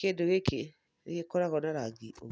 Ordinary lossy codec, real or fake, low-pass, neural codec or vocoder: none; real; none; none